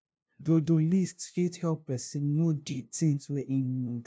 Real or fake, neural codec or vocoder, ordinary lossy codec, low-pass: fake; codec, 16 kHz, 0.5 kbps, FunCodec, trained on LibriTTS, 25 frames a second; none; none